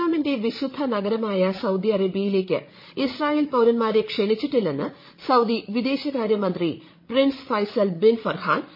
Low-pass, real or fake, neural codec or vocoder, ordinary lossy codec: 5.4 kHz; fake; codec, 16 kHz, 16 kbps, FreqCodec, larger model; MP3, 24 kbps